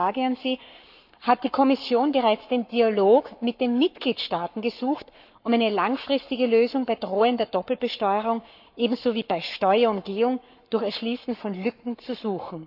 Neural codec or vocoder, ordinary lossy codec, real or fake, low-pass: codec, 44.1 kHz, 7.8 kbps, Pupu-Codec; none; fake; 5.4 kHz